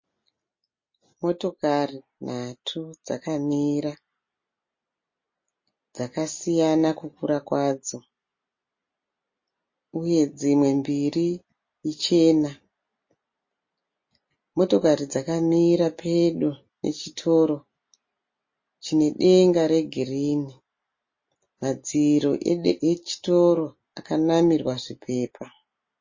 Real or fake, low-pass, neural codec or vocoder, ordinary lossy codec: real; 7.2 kHz; none; MP3, 32 kbps